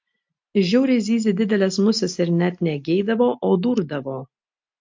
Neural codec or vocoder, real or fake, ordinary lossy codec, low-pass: none; real; MP3, 48 kbps; 7.2 kHz